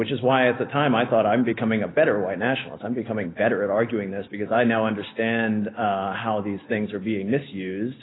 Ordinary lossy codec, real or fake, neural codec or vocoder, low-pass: AAC, 16 kbps; real; none; 7.2 kHz